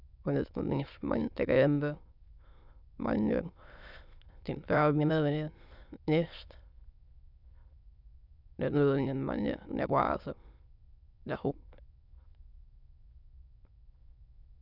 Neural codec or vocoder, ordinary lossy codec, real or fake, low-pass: autoencoder, 22.05 kHz, a latent of 192 numbers a frame, VITS, trained on many speakers; none; fake; 5.4 kHz